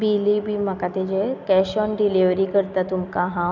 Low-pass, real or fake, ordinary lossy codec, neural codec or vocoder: 7.2 kHz; real; none; none